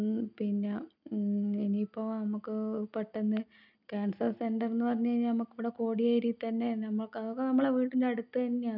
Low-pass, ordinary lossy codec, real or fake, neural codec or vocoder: 5.4 kHz; none; real; none